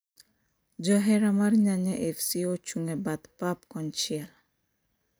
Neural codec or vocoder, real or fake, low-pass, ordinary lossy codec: none; real; none; none